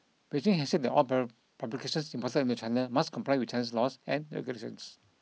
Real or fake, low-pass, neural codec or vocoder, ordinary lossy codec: real; none; none; none